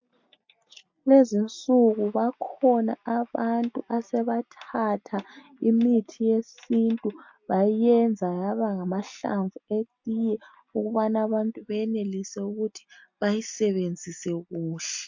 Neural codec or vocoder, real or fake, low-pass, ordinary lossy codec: none; real; 7.2 kHz; MP3, 48 kbps